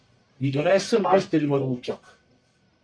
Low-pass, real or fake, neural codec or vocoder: 9.9 kHz; fake; codec, 44.1 kHz, 1.7 kbps, Pupu-Codec